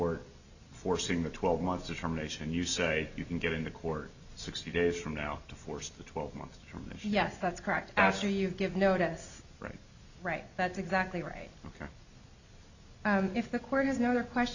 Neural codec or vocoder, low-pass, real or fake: none; 7.2 kHz; real